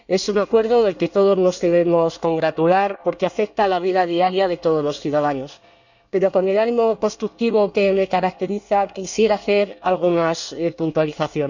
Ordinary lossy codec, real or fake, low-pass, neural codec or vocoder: none; fake; 7.2 kHz; codec, 24 kHz, 1 kbps, SNAC